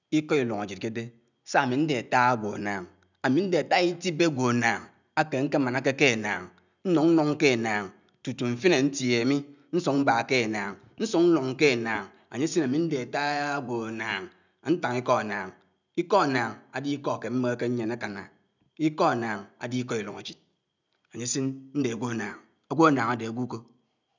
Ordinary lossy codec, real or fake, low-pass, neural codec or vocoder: none; real; 7.2 kHz; none